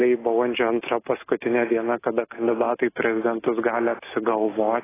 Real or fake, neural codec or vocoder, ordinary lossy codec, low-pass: real; none; AAC, 16 kbps; 3.6 kHz